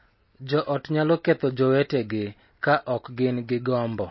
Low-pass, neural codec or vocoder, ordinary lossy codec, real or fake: 7.2 kHz; none; MP3, 24 kbps; real